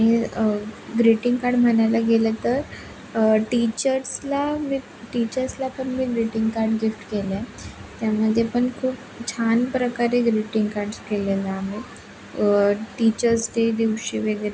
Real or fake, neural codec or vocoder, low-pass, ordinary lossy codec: real; none; none; none